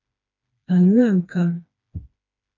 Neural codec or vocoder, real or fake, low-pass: codec, 16 kHz, 2 kbps, FreqCodec, smaller model; fake; 7.2 kHz